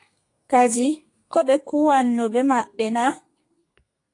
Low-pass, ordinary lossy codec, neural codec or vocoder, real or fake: 10.8 kHz; AAC, 48 kbps; codec, 32 kHz, 1.9 kbps, SNAC; fake